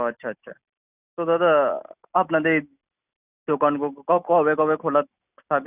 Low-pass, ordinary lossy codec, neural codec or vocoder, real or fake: 3.6 kHz; none; none; real